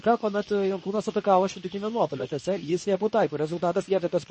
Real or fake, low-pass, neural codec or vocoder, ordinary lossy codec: fake; 10.8 kHz; codec, 24 kHz, 0.9 kbps, WavTokenizer, medium speech release version 2; MP3, 32 kbps